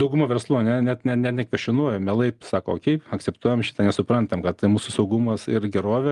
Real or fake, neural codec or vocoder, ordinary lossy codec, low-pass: real; none; Opus, 32 kbps; 10.8 kHz